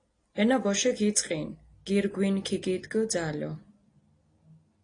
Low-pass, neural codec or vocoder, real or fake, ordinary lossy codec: 9.9 kHz; none; real; AAC, 32 kbps